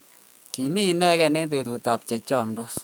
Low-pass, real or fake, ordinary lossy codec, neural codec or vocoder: none; fake; none; codec, 44.1 kHz, 2.6 kbps, SNAC